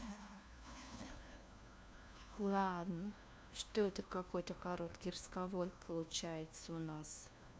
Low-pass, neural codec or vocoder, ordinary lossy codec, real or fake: none; codec, 16 kHz, 1 kbps, FunCodec, trained on LibriTTS, 50 frames a second; none; fake